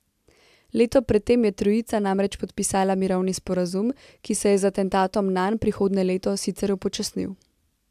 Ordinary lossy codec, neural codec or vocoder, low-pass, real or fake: AAC, 96 kbps; none; 14.4 kHz; real